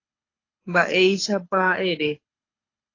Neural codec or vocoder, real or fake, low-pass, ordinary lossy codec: codec, 24 kHz, 6 kbps, HILCodec; fake; 7.2 kHz; AAC, 32 kbps